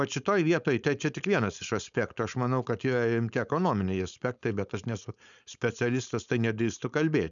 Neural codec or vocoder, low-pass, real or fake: codec, 16 kHz, 4.8 kbps, FACodec; 7.2 kHz; fake